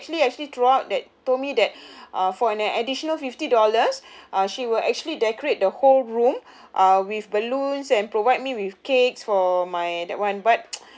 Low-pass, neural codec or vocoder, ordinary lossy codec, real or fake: none; none; none; real